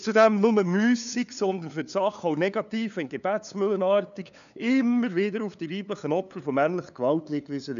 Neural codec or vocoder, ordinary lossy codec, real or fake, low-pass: codec, 16 kHz, 2 kbps, FunCodec, trained on LibriTTS, 25 frames a second; none; fake; 7.2 kHz